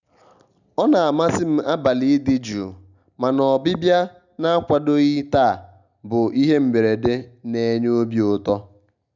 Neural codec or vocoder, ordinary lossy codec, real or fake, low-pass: none; none; real; 7.2 kHz